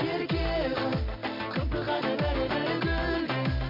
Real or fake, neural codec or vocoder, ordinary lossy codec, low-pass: real; none; MP3, 48 kbps; 5.4 kHz